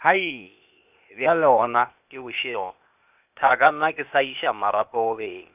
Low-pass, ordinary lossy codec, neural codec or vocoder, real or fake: 3.6 kHz; none; codec, 16 kHz, 0.8 kbps, ZipCodec; fake